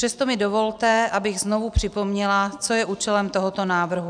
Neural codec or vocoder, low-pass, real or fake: none; 9.9 kHz; real